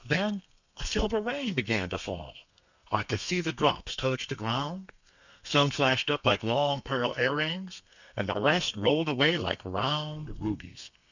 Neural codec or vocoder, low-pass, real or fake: codec, 32 kHz, 1.9 kbps, SNAC; 7.2 kHz; fake